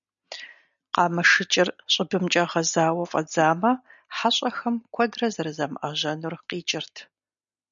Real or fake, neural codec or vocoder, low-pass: real; none; 7.2 kHz